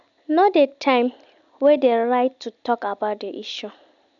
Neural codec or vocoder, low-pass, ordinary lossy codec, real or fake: none; 7.2 kHz; none; real